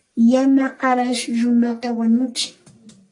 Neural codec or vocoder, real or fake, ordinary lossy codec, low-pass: codec, 44.1 kHz, 1.7 kbps, Pupu-Codec; fake; AAC, 48 kbps; 10.8 kHz